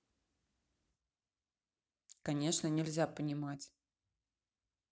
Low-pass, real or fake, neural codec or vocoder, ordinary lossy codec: none; real; none; none